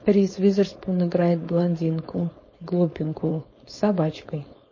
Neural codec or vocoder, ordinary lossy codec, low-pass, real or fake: codec, 16 kHz, 4.8 kbps, FACodec; MP3, 32 kbps; 7.2 kHz; fake